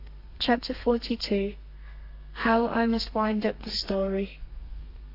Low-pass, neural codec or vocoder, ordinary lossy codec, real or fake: 5.4 kHz; codec, 32 kHz, 1.9 kbps, SNAC; AAC, 32 kbps; fake